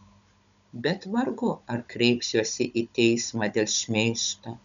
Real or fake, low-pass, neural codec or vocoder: fake; 7.2 kHz; codec, 16 kHz, 16 kbps, FunCodec, trained on Chinese and English, 50 frames a second